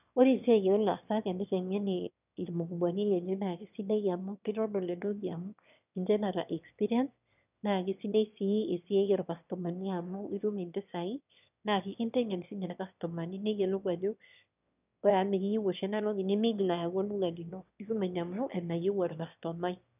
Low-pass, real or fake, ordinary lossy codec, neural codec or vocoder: 3.6 kHz; fake; none; autoencoder, 22.05 kHz, a latent of 192 numbers a frame, VITS, trained on one speaker